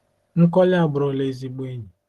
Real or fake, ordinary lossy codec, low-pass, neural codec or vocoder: real; Opus, 16 kbps; 14.4 kHz; none